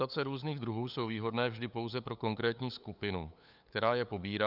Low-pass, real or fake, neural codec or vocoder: 5.4 kHz; fake; codec, 16 kHz, 8 kbps, FunCodec, trained on LibriTTS, 25 frames a second